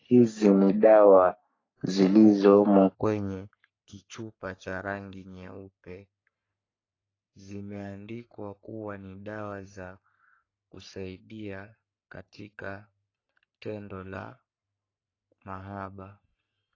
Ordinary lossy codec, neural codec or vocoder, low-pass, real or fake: AAC, 32 kbps; codec, 44.1 kHz, 3.4 kbps, Pupu-Codec; 7.2 kHz; fake